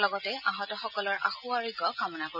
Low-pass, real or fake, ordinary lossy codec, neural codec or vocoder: 5.4 kHz; real; none; none